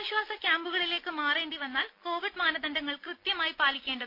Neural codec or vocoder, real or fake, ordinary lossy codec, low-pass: none; real; MP3, 32 kbps; 5.4 kHz